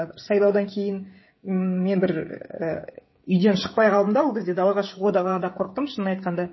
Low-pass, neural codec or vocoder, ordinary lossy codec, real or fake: 7.2 kHz; codec, 16 kHz, 16 kbps, FreqCodec, smaller model; MP3, 24 kbps; fake